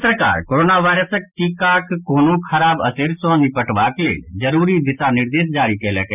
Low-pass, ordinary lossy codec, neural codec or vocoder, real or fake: 3.6 kHz; none; none; real